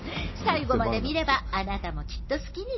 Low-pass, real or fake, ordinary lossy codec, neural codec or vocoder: 7.2 kHz; real; MP3, 24 kbps; none